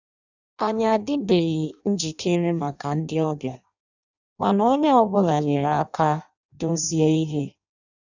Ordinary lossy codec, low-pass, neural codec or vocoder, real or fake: none; 7.2 kHz; codec, 16 kHz in and 24 kHz out, 0.6 kbps, FireRedTTS-2 codec; fake